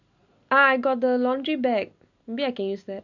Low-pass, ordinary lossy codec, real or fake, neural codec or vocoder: 7.2 kHz; none; real; none